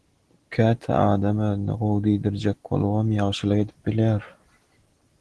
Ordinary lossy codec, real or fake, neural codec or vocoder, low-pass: Opus, 16 kbps; real; none; 10.8 kHz